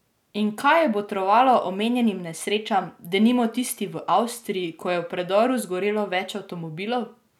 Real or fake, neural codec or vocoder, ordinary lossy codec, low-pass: real; none; none; 19.8 kHz